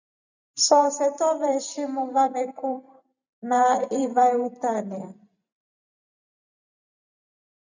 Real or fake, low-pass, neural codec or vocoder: fake; 7.2 kHz; vocoder, 44.1 kHz, 128 mel bands every 512 samples, BigVGAN v2